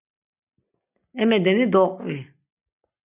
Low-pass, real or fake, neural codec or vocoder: 3.6 kHz; real; none